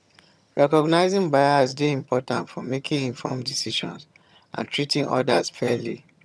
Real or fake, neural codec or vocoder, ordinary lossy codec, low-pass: fake; vocoder, 22.05 kHz, 80 mel bands, HiFi-GAN; none; none